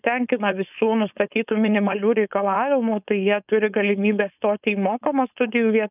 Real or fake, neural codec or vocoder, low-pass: fake; codec, 16 kHz, 4.8 kbps, FACodec; 3.6 kHz